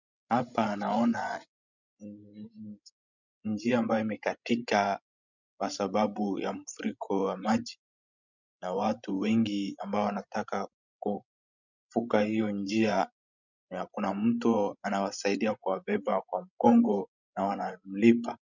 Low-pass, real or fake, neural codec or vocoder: 7.2 kHz; fake; codec, 16 kHz, 16 kbps, FreqCodec, larger model